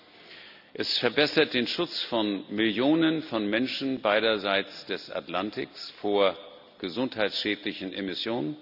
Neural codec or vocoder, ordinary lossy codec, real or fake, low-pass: none; none; real; 5.4 kHz